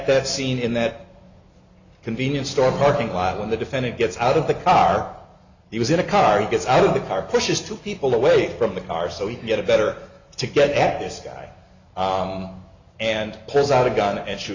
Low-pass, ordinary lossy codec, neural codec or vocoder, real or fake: 7.2 kHz; Opus, 64 kbps; none; real